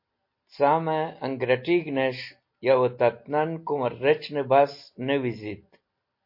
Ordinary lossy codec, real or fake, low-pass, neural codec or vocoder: MP3, 32 kbps; real; 5.4 kHz; none